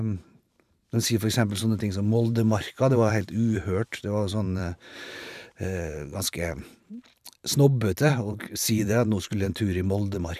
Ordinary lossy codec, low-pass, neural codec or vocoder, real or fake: none; 14.4 kHz; vocoder, 44.1 kHz, 128 mel bands every 512 samples, BigVGAN v2; fake